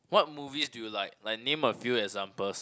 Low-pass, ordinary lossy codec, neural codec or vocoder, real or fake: none; none; none; real